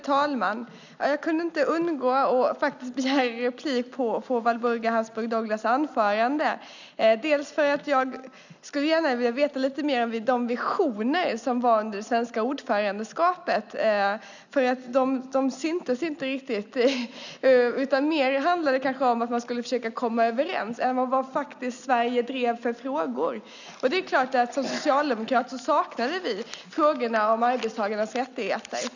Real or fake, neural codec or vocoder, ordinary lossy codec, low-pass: real; none; none; 7.2 kHz